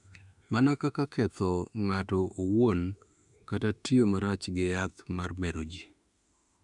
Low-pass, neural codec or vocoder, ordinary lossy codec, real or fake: 10.8 kHz; autoencoder, 48 kHz, 32 numbers a frame, DAC-VAE, trained on Japanese speech; none; fake